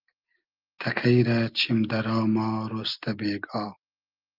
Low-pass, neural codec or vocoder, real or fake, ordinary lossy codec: 5.4 kHz; none; real; Opus, 24 kbps